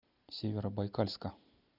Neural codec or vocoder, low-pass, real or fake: none; 5.4 kHz; real